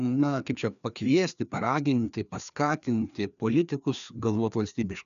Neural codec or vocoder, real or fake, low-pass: codec, 16 kHz, 2 kbps, FreqCodec, larger model; fake; 7.2 kHz